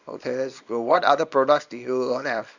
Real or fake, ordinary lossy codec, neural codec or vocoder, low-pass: fake; none; codec, 24 kHz, 0.9 kbps, WavTokenizer, small release; 7.2 kHz